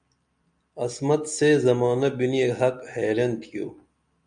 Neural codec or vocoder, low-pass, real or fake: none; 9.9 kHz; real